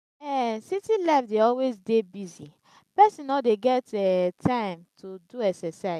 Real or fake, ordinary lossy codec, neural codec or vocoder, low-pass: real; none; none; 14.4 kHz